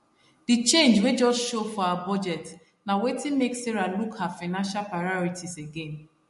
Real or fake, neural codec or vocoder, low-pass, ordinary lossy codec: real; none; 14.4 kHz; MP3, 48 kbps